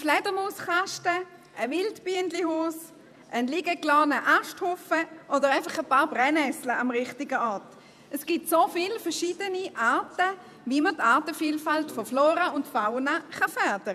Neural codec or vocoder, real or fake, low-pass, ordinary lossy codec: vocoder, 48 kHz, 128 mel bands, Vocos; fake; 14.4 kHz; none